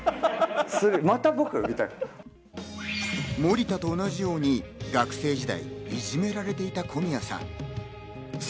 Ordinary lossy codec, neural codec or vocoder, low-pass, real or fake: none; none; none; real